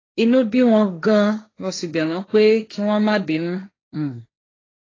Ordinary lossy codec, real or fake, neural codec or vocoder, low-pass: AAC, 32 kbps; fake; codec, 16 kHz, 1.1 kbps, Voila-Tokenizer; 7.2 kHz